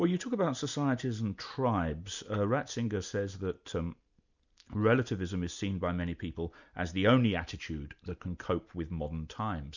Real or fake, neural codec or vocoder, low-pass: real; none; 7.2 kHz